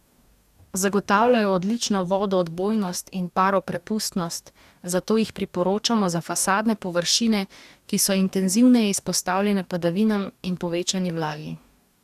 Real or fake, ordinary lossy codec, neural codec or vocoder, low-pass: fake; none; codec, 44.1 kHz, 2.6 kbps, DAC; 14.4 kHz